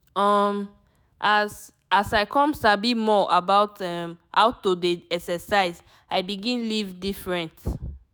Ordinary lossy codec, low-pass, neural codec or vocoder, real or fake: none; none; autoencoder, 48 kHz, 128 numbers a frame, DAC-VAE, trained on Japanese speech; fake